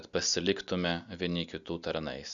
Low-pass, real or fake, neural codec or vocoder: 7.2 kHz; real; none